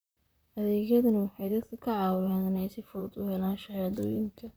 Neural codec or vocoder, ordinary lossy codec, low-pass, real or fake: vocoder, 44.1 kHz, 128 mel bands, Pupu-Vocoder; none; none; fake